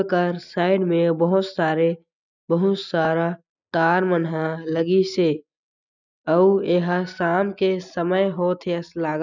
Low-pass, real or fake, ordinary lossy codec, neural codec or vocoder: 7.2 kHz; real; none; none